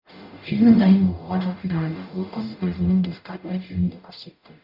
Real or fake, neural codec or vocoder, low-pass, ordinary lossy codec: fake; codec, 44.1 kHz, 0.9 kbps, DAC; 5.4 kHz; none